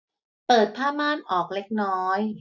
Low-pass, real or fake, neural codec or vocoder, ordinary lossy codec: 7.2 kHz; real; none; none